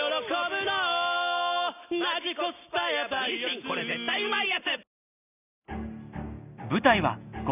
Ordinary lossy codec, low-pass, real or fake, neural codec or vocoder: none; 3.6 kHz; real; none